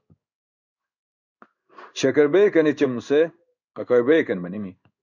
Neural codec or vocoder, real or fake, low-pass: codec, 16 kHz in and 24 kHz out, 1 kbps, XY-Tokenizer; fake; 7.2 kHz